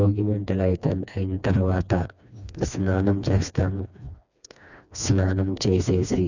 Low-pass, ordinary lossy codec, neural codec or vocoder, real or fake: 7.2 kHz; none; codec, 16 kHz, 2 kbps, FreqCodec, smaller model; fake